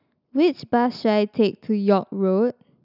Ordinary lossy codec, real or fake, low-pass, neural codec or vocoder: none; real; 5.4 kHz; none